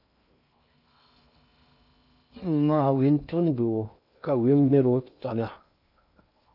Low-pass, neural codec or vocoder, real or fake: 5.4 kHz; codec, 16 kHz in and 24 kHz out, 0.6 kbps, FocalCodec, streaming, 2048 codes; fake